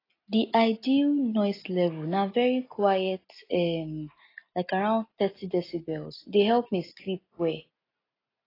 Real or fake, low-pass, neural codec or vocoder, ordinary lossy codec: real; 5.4 kHz; none; AAC, 24 kbps